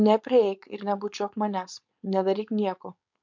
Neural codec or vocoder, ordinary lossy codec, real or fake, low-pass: codec, 16 kHz, 4.8 kbps, FACodec; MP3, 64 kbps; fake; 7.2 kHz